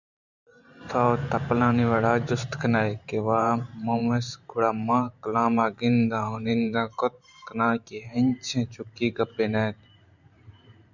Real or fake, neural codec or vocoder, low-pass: fake; vocoder, 44.1 kHz, 128 mel bands every 512 samples, BigVGAN v2; 7.2 kHz